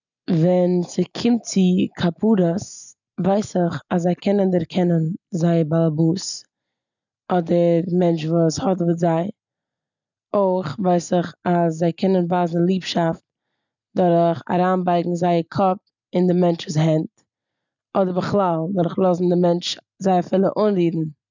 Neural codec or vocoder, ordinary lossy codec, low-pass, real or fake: none; none; 7.2 kHz; real